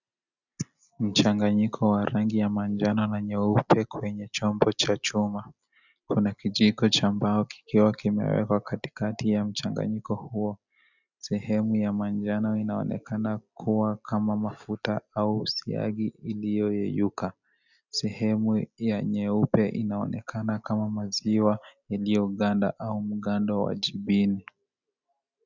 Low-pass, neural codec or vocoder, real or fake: 7.2 kHz; none; real